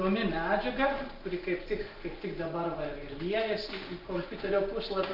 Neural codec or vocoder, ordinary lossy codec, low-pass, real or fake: none; Opus, 32 kbps; 5.4 kHz; real